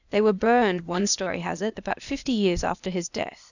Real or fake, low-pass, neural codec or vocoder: fake; 7.2 kHz; codec, 16 kHz, 0.8 kbps, ZipCodec